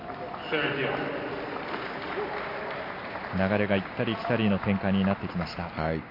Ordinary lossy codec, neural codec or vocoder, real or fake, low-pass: MP3, 32 kbps; none; real; 5.4 kHz